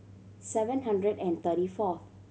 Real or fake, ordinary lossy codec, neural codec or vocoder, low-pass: real; none; none; none